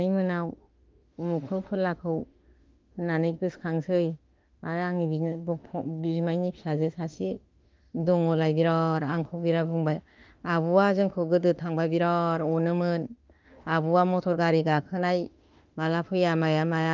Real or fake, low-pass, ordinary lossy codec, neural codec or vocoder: fake; 7.2 kHz; Opus, 24 kbps; autoencoder, 48 kHz, 32 numbers a frame, DAC-VAE, trained on Japanese speech